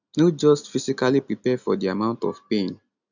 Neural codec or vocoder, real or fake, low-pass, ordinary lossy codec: none; real; 7.2 kHz; none